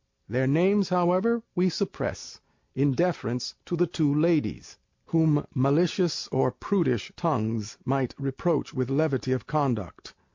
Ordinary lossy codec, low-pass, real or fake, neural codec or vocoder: MP3, 48 kbps; 7.2 kHz; real; none